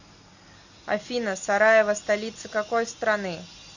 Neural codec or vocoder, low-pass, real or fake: none; 7.2 kHz; real